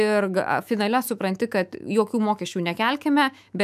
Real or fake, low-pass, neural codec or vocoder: fake; 14.4 kHz; autoencoder, 48 kHz, 128 numbers a frame, DAC-VAE, trained on Japanese speech